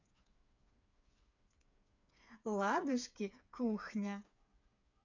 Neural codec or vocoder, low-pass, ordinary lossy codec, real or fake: codec, 16 kHz, 4 kbps, FreqCodec, smaller model; 7.2 kHz; none; fake